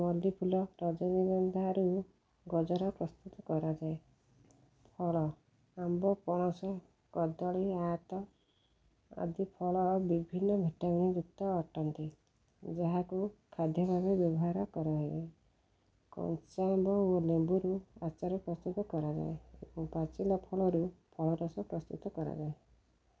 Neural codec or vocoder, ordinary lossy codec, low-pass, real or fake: none; none; none; real